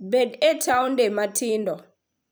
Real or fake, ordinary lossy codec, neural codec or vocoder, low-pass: fake; none; vocoder, 44.1 kHz, 128 mel bands every 256 samples, BigVGAN v2; none